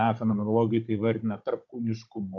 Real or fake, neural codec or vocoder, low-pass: fake; codec, 16 kHz, 16 kbps, FunCodec, trained on Chinese and English, 50 frames a second; 7.2 kHz